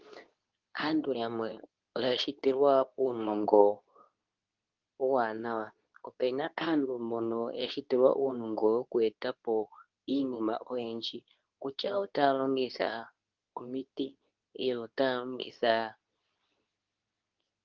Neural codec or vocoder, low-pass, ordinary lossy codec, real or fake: codec, 24 kHz, 0.9 kbps, WavTokenizer, medium speech release version 2; 7.2 kHz; Opus, 24 kbps; fake